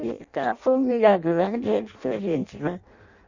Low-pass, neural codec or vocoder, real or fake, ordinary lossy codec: 7.2 kHz; codec, 16 kHz in and 24 kHz out, 0.6 kbps, FireRedTTS-2 codec; fake; Opus, 64 kbps